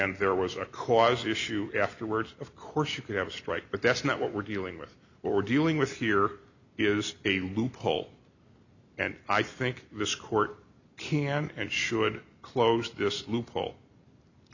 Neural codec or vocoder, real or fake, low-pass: none; real; 7.2 kHz